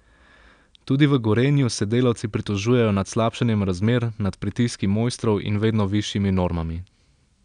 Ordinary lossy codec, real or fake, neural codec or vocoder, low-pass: none; real; none; 9.9 kHz